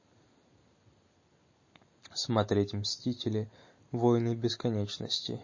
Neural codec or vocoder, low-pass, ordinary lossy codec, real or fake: none; 7.2 kHz; MP3, 32 kbps; real